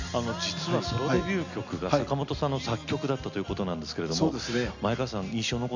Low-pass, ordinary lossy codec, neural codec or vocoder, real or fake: 7.2 kHz; none; none; real